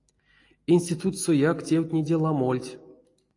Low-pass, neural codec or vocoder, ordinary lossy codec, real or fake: 10.8 kHz; none; AAC, 48 kbps; real